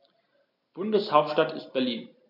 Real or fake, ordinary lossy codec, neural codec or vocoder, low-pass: real; none; none; 5.4 kHz